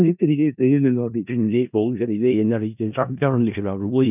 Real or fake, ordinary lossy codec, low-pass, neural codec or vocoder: fake; none; 3.6 kHz; codec, 16 kHz in and 24 kHz out, 0.4 kbps, LongCat-Audio-Codec, four codebook decoder